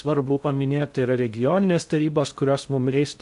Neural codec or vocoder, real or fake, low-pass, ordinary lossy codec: codec, 16 kHz in and 24 kHz out, 0.6 kbps, FocalCodec, streaming, 2048 codes; fake; 10.8 kHz; MP3, 64 kbps